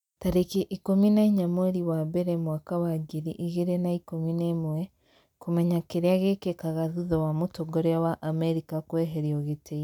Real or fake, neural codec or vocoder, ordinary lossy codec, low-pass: real; none; none; 19.8 kHz